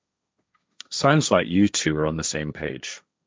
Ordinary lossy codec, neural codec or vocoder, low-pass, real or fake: none; codec, 16 kHz, 1.1 kbps, Voila-Tokenizer; none; fake